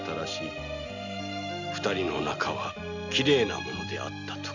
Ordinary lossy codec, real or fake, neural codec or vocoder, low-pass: none; real; none; 7.2 kHz